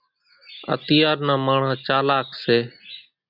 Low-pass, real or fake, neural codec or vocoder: 5.4 kHz; real; none